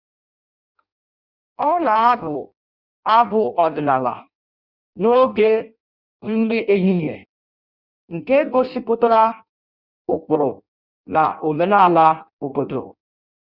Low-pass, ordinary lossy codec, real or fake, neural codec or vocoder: 5.4 kHz; Opus, 64 kbps; fake; codec, 16 kHz in and 24 kHz out, 0.6 kbps, FireRedTTS-2 codec